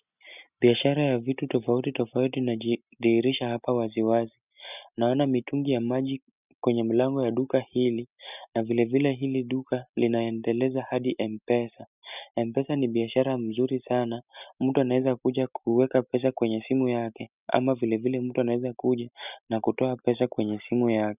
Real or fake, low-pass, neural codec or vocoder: real; 3.6 kHz; none